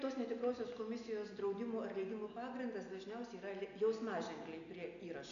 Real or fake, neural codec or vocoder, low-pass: real; none; 7.2 kHz